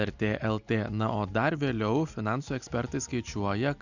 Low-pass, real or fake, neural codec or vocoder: 7.2 kHz; real; none